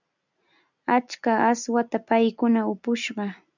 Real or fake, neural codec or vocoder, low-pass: real; none; 7.2 kHz